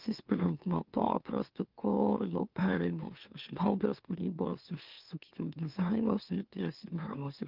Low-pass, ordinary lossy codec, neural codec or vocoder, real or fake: 5.4 kHz; Opus, 32 kbps; autoencoder, 44.1 kHz, a latent of 192 numbers a frame, MeloTTS; fake